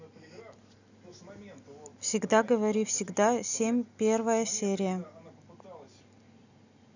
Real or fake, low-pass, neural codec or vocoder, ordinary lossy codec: real; 7.2 kHz; none; none